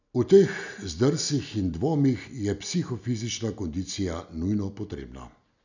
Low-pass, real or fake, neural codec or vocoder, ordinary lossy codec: 7.2 kHz; real; none; none